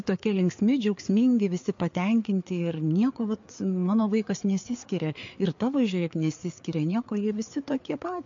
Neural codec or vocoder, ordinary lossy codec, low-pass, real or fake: codec, 16 kHz, 4 kbps, FreqCodec, larger model; MP3, 48 kbps; 7.2 kHz; fake